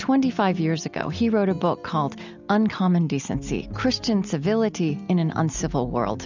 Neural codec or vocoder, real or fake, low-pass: none; real; 7.2 kHz